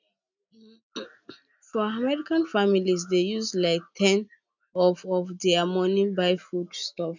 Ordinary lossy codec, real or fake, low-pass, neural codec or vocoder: none; real; 7.2 kHz; none